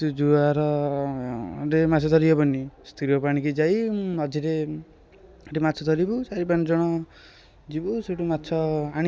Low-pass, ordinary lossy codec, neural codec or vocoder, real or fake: none; none; none; real